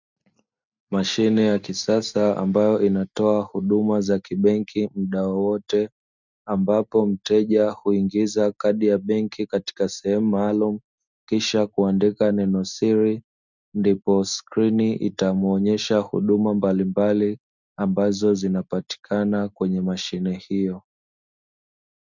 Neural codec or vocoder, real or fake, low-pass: none; real; 7.2 kHz